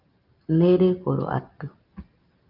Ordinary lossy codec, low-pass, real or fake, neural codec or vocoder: Opus, 24 kbps; 5.4 kHz; real; none